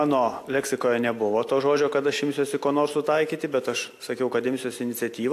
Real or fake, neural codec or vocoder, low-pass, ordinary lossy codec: real; none; 14.4 kHz; AAC, 64 kbps